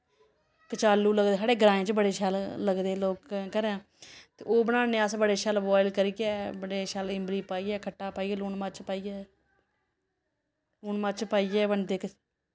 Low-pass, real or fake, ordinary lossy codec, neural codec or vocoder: none; real; none; none